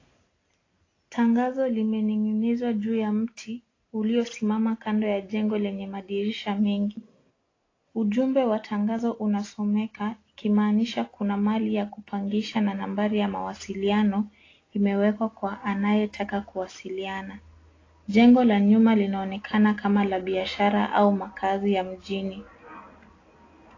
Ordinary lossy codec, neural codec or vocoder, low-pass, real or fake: AAC, 32 kbps; none; 7.2 kHz; real